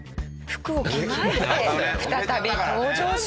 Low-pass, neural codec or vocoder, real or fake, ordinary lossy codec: none; none; real; none